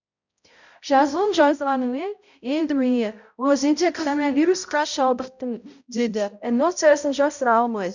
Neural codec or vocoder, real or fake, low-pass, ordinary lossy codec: codec, 16 kHz, 0.5 kbps, X-Codec, HuBERT features, trained on balanced general audio; fake; 7.2 kHz; none